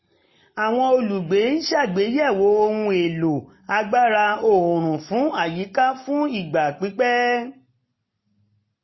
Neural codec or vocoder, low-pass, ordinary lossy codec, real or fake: none; 7.2 kHz; MP3, 24 kbps; real